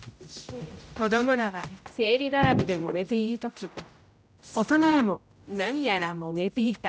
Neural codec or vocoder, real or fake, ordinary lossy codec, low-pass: codec, 16 kHz, 0.5 kbps, X-Codec, HuBERT features, trained on general audio; fake; none; none